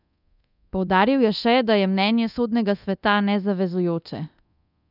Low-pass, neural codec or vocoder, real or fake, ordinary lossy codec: 5.4 kHz; codec, 24 kHz, 0.9 kbps, DualCodec; fake; none